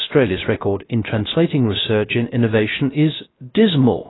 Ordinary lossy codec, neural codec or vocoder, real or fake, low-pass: AAC, 16 kbps; codec, 16 kHz, 0.3 kbps, FocalCodec; fake; 7.2 kHz